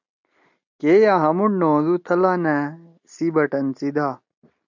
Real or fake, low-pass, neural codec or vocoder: real; 7.2 kHz; none